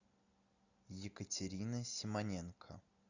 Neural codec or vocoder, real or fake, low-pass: none; real; 7.2 kHz